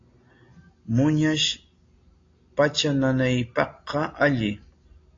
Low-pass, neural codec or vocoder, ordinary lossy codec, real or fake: 7.2 kHz; none; AAC, 32 kbps; real